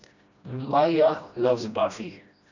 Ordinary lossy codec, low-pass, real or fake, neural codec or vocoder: none; 7.2 kHz; fake; codec, 16 kHz, 1 kbps, FreqCodec, smaller model